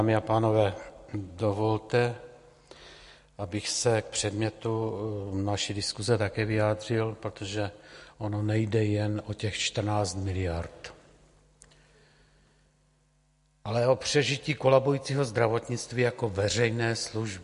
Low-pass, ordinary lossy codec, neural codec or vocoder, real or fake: 10.8 kHz; MP3, 48 kbps; none; real